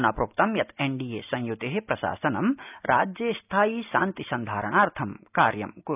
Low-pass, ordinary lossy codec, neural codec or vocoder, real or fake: 3.6 kHz; none; none; real